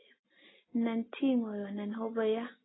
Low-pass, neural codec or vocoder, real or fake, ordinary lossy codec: 7.2 kHz; none; real; AAC, 16 kbps